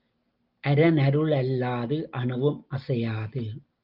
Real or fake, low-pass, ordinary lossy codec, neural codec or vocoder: real; 5.4 kHz; Opus, 32 kbps; none